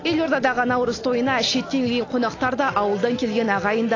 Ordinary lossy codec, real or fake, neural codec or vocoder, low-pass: AAC, 32 kbps; real; none; 7.2 kHz